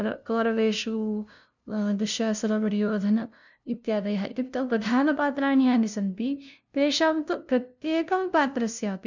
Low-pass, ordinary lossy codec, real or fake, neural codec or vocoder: 7.2 kHz; none; fake; codec, 16 kHz, 0.5 kbps, FunCodec, trained on LibriTTS, 25 frames a second